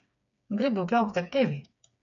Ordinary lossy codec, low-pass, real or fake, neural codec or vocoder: MP3, 64 kbps; 7.2 kHz; fake; codec, 16 kHz, 4 kbps, FreqCodec, smaller model